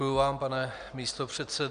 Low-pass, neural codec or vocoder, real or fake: 9.9 kHz; none; real